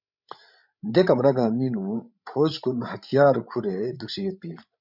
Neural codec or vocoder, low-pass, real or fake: codec, 16 kHz, 16 kbps, FreqCodec, larger model; 5.4 kHz; fake